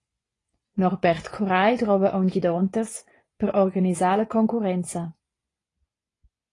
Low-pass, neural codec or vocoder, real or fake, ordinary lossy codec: 10.8 kHz; vocoder, 24 kHz, 100 mel bands, Vocos; fake; AAC, 32 kbps